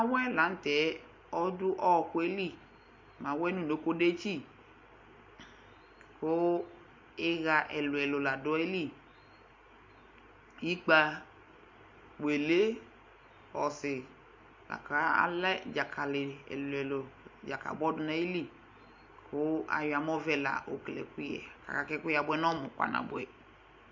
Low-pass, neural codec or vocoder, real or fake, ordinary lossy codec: 7.2 kHz; none; real; MP3, 48 kbps